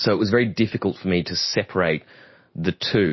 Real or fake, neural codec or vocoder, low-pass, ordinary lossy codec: real; none; 7.2 kHz; MP3, 24 kbps